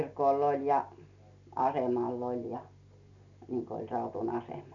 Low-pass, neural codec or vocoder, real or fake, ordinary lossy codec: 7.2 kHz; none; real; MP3, 96 kbps